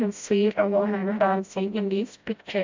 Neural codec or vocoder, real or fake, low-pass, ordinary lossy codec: codec, 16 kHz, 0.5 kbps, FreqCodec, smaller model; fake; 7.2 kHz; MP3, 64 kbps